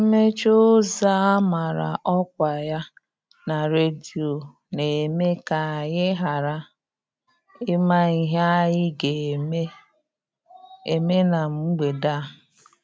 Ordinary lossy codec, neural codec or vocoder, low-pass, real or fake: none; none; none; real